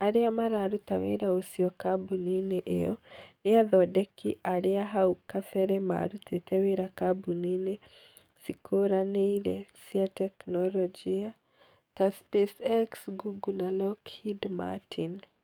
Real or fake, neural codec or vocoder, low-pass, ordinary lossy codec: fake; codec, 44.1 kHz, 7.8 kbps, DAC; 19.8 kHz; none